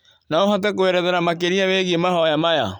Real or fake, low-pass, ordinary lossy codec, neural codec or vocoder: real; 19.8 kHz; none; none